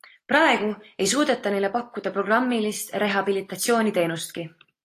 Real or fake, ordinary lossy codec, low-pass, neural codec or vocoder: real; AAC, 48 kbps; 14.4 kHz; none